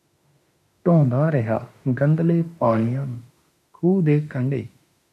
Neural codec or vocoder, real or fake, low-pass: autoencoder, 48 kHz, 32 numbers a frame, DAC-VAE, trained on Japanese speech; fake; 14.4 kHz